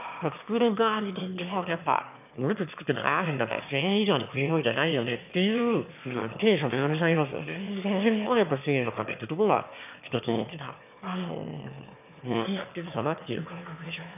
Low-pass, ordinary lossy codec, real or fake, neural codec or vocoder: 3.6 kHz; none; fake; autoencoder, 22.05 kHz, a latent of 192 numbers a frame, VITS, trained on one speaker